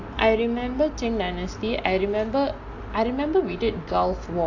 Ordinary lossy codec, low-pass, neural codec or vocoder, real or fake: AAC, 48 kbps; 7.2 kHz; none; real